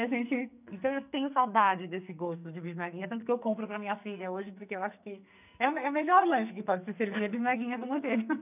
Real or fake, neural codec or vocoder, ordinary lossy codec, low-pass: fake; codec, 44.1 kHz, 2.6 kbps, SNAC; none; 3.6 kHz